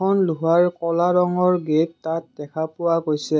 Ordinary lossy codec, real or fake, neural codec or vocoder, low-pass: none; real; none; none